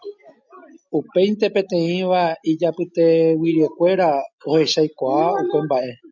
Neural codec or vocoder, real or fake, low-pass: none; real; 7.2 kHz